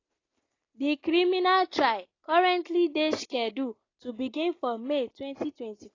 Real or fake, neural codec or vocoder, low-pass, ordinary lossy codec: real; none; 7.2 kHz; AAC, 32 kbps